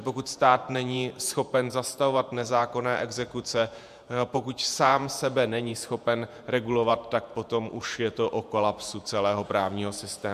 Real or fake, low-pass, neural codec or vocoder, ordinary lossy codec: fake; 14.4 kHz; vocoder, 48 kHz, 128 mel bands, Vocos; MP3, 96 kbps